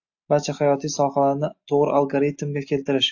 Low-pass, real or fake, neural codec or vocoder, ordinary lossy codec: 7.2 kHz; real; none; AAC, 48 kbps